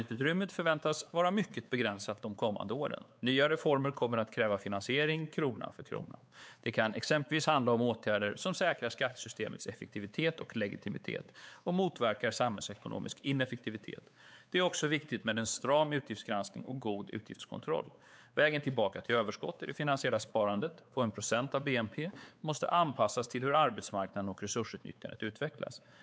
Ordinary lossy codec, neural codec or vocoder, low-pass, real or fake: none; codec, 16 kHz, 4 kbps, X-Codec, WavLM features, trained on Multilingual LibriSpeech; none; fake